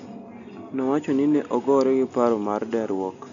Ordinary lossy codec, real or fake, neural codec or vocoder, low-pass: none; real; none; 7.2 kHz